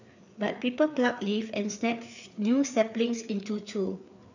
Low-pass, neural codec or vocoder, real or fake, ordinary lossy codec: 7.2 kHz; codec, 16 kHz, 4 kbps, FreqCodec, larger model; fake; none